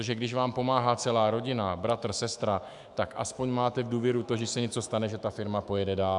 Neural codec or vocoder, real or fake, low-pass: autoencoder, 48 kHz, 128 numbers a frame, DAC-VAE, trained on Japanese speech; fake; 10.8 kHz